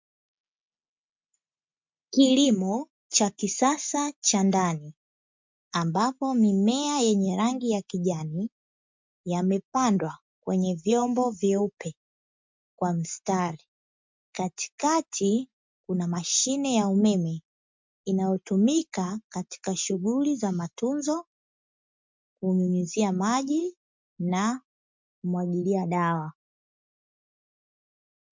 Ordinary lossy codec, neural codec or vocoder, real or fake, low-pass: MP3, 64 kbps; none; real; 7.2 kHz